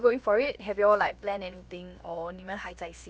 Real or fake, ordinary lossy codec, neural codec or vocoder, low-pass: fake; none; codec, 16 kHz, about 1 kbps, DyCAST, with the encoder's durations; none